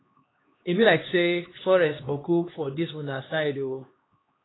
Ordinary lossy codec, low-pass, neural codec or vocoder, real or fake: AAC, 16 kbps; 7.2 kHz; codec, 16 kHz, 2 kbps, X-Codec, HuBERT features, trained on LibriSpeech; fake